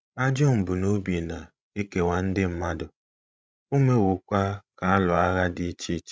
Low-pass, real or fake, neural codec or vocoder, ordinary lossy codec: none; fake; codec, 16 kHz, 16 kbps, FreqCodec, larger model; none